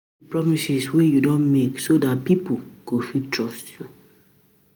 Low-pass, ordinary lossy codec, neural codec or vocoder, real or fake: none; none; none; real